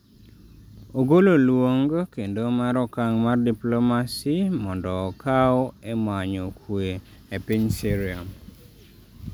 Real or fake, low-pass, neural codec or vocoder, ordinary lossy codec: real; none; none; none